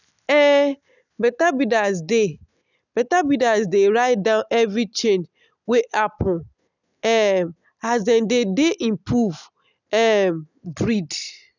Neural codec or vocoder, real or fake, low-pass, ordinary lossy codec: none; real; 7.2 kHz; none